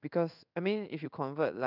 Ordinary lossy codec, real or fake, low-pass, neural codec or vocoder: none; fake; 5.4 kHz; codec, 16 kHz in and 24 kHz out, 1 kbps, XY-Tokenizer